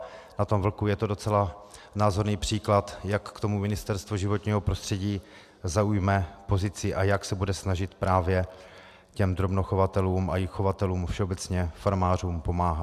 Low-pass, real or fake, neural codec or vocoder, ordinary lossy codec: 14.4 kHz; real; none; AAC, 96 kbps